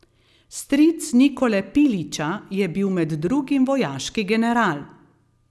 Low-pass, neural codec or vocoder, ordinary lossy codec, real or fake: none; none; none; real